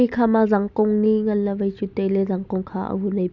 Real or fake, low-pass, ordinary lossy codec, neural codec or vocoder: real; 7.2 kHz; none; none